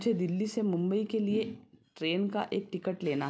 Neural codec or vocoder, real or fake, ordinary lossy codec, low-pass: none; real; none; none